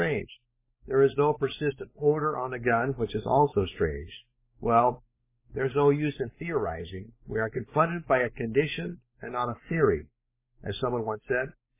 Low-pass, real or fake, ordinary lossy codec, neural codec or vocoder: 3.6 kHz; fake; MP3, 16 kbps; codec, 16 kHz, 4 kbps, X-Codec, WavLM features, trained on Multilingual LibriSpeech